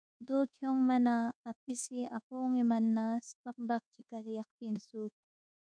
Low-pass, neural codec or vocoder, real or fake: 9.9 kHz; codec, 24 kHz, 1.2 kbps, DualCodec; fake